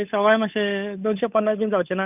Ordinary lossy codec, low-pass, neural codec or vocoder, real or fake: none; 3.6 kHz; codec, 16 kHz, 8 kbps, FunCodec, trained on Chinese and English, 25 frames a second; fake